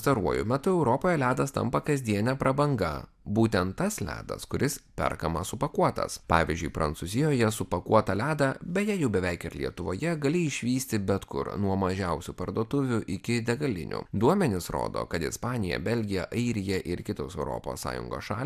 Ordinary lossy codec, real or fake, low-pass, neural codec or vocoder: AAC, 96 kbps; real; 14.4 kHz; none